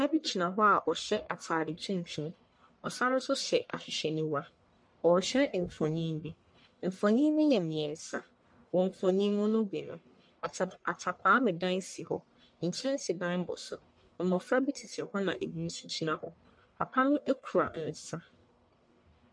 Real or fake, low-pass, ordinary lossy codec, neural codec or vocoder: fake; 9.9 kHz; MP3, 64 kbps; codec, 44.1 kHz, 1.7 kbps, Pupu-Codec